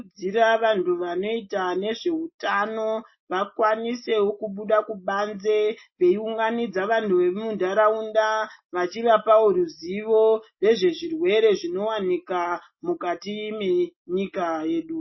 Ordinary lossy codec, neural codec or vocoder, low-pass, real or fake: MP3, 24 kbps; none; 7.2 kHz; real